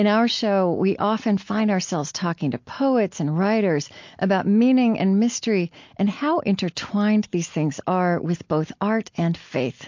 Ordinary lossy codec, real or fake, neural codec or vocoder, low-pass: MP3, 48 kbps; real; none; 7.2 kHz